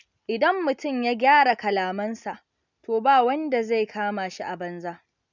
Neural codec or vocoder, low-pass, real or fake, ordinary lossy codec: none; 7.2 kHz; real; none